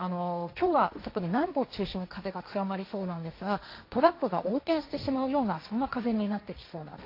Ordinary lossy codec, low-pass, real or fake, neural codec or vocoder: AAC, 32 kbps; 5.4 kHz; fake; codec, 16 kHz, 1.1 kbps, Voila-Tokenizer